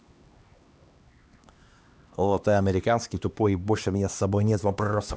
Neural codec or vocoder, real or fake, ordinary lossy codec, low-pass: codec, 16 kHz, 2 kbps, X-Codec, HuBERT features, trained on LibriSpeech; fake; none; none